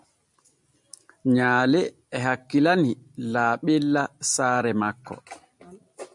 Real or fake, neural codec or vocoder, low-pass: real; none; 10.8 kHz